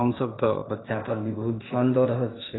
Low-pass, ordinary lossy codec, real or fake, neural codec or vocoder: 7.2 kHz; AAC, 16 kbps; fake; codec, 16 kHz, 0.8 kbps, ZipCodec